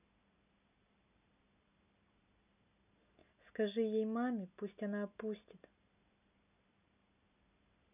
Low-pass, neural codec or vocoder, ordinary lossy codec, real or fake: 3.6 kHz; none; none; real